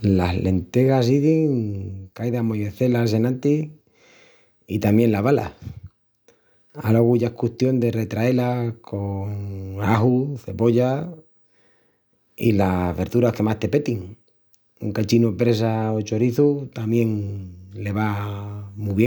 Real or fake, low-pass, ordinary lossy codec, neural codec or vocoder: real; none; none; none